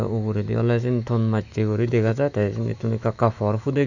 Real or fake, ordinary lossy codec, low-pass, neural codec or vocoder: fake; none; 7.2 kHz; autoencoder, 48 kHz, 128 numbers a frame, DAC-VAE, trained on Japanese speech